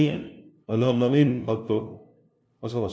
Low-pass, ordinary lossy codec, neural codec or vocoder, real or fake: none; none; codec, 16 kHz, 0.5 kbps, FunCodec, trained on LibriTTS, 25 frames a second; fake